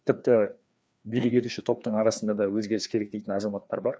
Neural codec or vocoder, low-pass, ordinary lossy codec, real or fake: codec, 16 kHz, 2 kbps, FreqCodec, larger model; none; none; fake